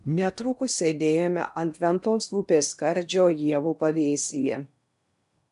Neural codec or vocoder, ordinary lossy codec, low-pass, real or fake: codec, 16 kHz in and 24 kHz out, 0.8 kbps, FocalCodec, streaming, 65536 codes; MP3, 96 kbps; 10.8 kHz; fake